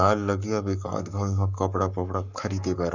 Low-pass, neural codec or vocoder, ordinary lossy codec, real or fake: 7.2 kHz; codec, 44.1 kHz, 7.8 kbps, Pupu-Codec; none; fake